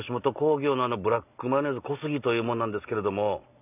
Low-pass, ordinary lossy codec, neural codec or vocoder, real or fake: 3.6 kHz; none; none; real